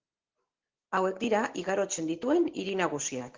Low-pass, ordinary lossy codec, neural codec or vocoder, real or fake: 7.2 kHz; Opus, 16 kbps; none; real